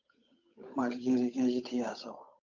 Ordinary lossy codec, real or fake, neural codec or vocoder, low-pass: AAC, 48 kbps; fake; codec, 16 kHz, 8 kbps, FunCodec, trained on Chinese and English, 25 frames a second; 7.2 kHz